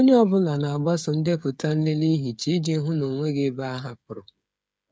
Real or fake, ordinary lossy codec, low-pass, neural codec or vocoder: fake; none; none; codec, 16 kHz, 8 kbps, FreqCodec, smaller model